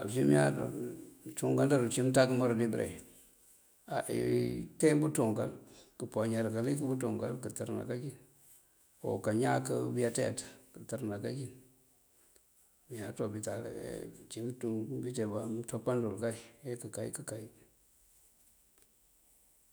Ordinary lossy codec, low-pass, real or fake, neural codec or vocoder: none; none; fake; autoencoder, 48 kHz, 128 numbers a frame, DAC-VAE, trained on Japanese speech